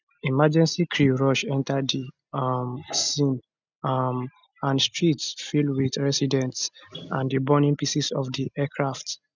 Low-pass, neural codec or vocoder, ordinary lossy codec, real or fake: 7.2 kHz; none; none; real